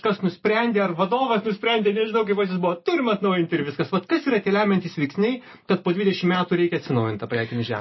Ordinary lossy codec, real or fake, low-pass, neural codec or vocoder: MP3, 24 kbps; real; 7.2 kHz; none